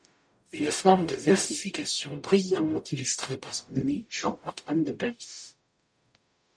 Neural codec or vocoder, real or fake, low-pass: codec, 44.1 kHz, 0.9 kbps, DAC; fake; 9.9 kHz